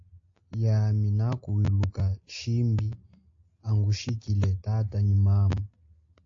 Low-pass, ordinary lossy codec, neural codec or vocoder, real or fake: 7.2 kHz; AAC, 48 kbps; none; real